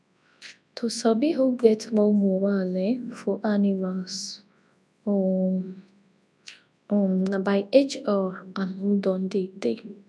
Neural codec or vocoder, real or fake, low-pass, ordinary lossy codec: codec, 24 kHz, 0.9 kbps, WavTokenizer, large speech release; fake; none; none